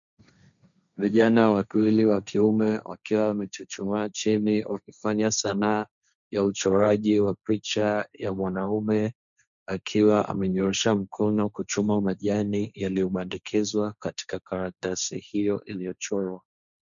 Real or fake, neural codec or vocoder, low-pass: fake; codec, 16 kHz, 1.1 kbps, Voila-Tokenizer; 7.2 kHz